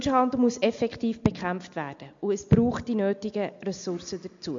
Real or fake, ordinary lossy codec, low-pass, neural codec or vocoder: real; MP3, 48 kbps; 7.2 kHz; none